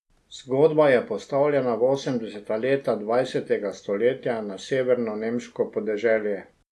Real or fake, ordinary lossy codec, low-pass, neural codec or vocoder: real; none; none; none